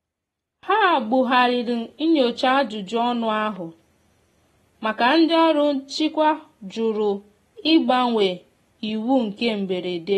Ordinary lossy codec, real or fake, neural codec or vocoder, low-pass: AAC, 32 kbps; real; none; 19.8 kHz